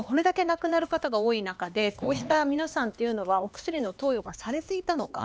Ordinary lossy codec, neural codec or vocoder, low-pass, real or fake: none; codec, 16 kHz, 2 kbps, X-Codec, HuBERT features, trained on LibriSpeech; none; fake